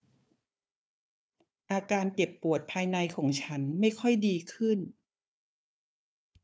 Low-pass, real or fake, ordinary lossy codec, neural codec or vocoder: none; fake; none; codec, 16 kHz, 4 kbps, FunCodec, trained on Chinese and English, 50 frames a second